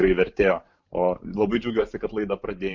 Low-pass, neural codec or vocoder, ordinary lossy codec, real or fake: 7.2 kHz; vocoder, 44.1 kHz, 128 mel bands every 512 samples, BigVGAN v2; MP3, 48 kbps; fake